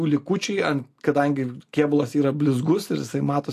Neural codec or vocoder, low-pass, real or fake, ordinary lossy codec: none; 14.4 kHz; real; AAC, 64 kbps